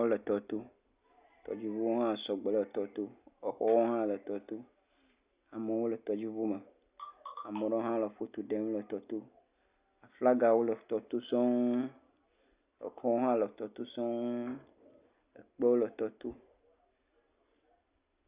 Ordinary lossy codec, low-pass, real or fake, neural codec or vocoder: Opus, 64 kbps; 3.6 kHz; real; none